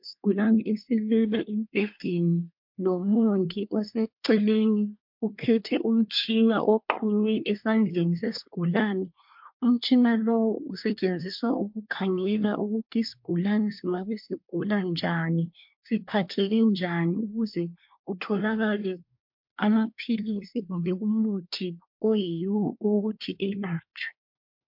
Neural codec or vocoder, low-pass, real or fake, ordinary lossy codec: codec, 24 kHz, 1 kbps, SNAC; 5.4 kHz; fake; MP3, 48 kbps